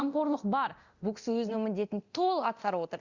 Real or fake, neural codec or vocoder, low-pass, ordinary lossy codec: fake; codec, 24 kHz, 0.9 kbps, DualCodec; 7.2 kHz; Opus, 64 kbps